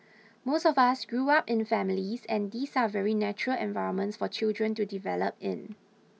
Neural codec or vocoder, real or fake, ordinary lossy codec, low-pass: none; real; none; none